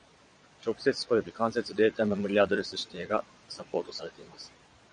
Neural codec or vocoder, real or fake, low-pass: vocoder, 22.05 kHz, 80 mel bands, Vocos; fake; 9.9 kHz